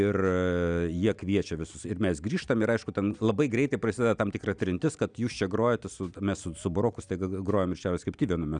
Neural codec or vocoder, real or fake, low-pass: none; real; 9.9 kHz